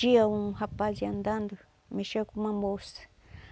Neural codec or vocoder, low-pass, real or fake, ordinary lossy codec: none; none; real; none